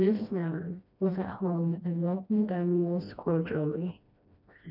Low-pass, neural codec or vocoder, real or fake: 5.4 kHz; codec, 16 kHz, 1 kbps, FreqCodec, smaller model; fake